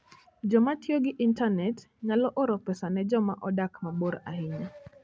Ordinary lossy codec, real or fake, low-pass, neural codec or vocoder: none; real; none; none